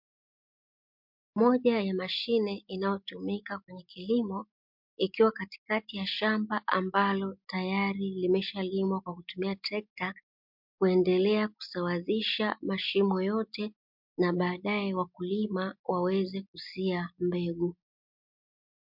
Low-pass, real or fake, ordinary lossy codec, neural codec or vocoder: 5.4 kHz; real; MP3, 48 kbps; none